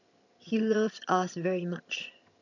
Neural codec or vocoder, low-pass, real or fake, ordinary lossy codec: vocoder, 22.05 kHz, 80 mel bands, HiFi-GAN; 7.2 kHz; fake; none